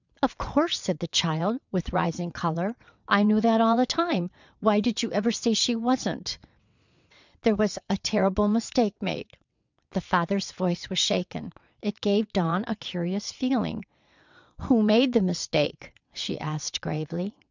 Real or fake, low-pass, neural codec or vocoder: fake; 7.2 kHz; vocoder, 22.05 kHz, 80 mel bands, WaveNeXt